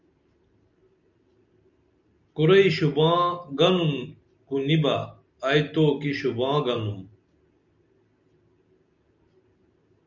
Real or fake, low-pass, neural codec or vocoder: real; 7.2 kHz; none